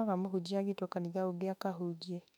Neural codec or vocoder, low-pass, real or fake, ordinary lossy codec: autoencoder, 48 kHz, 32 numbers a frame, DAC-VAE, trained on Japanese speech; 19.8 kHz; fake; none